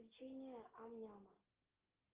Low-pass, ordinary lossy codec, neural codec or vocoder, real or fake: 3.6 kHz; Opus, 16 kbps; none; real